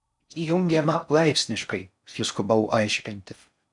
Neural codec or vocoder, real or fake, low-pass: codec, 16 kHz in and 24 kHz out, 0.6 kbps, FocalCodec, streaming, 4096 codes; fake; 10.8 kHz